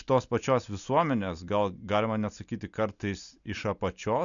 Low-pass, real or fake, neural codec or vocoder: 7.2 kHz; real; none